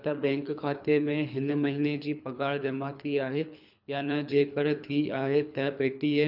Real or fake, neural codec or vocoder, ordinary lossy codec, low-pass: fake; codec, 24 kHz, 3 kbps, HILCodec; none; 5.4 kHz